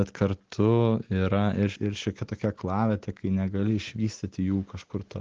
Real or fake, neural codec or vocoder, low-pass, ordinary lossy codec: real; none; 7.2 kHz; Opus, 16 kbps